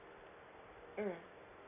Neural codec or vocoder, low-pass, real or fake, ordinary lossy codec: none; 3.6 kHz; real; none